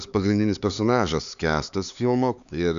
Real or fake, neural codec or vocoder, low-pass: fake; codec, 16 kHz, 4 kbps, X-Codec, HuBERT features, trained on LibriSpeech; 7.2 kHz